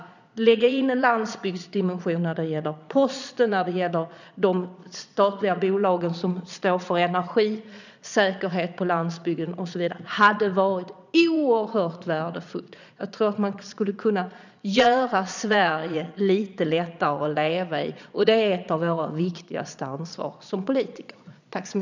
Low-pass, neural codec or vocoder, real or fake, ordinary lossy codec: 7.2 kHz; vocoder, 22.05 kHz, 80 mel bands, Vocos; fake; none